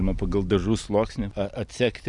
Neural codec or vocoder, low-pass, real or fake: none; 10.8 kHz; real